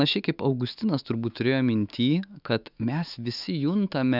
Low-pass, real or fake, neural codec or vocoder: 5.4 kHz; fake; autoencoder, 48 kHz, 128 numbers a frame, DAC-VAE, trained on Japanese speech